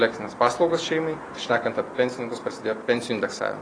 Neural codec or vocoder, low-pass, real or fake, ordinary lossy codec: none; 9.9 kHz; real; AAC, 32 kbps